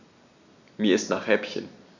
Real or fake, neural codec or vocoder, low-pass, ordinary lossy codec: real; none; 7.2 kHz; none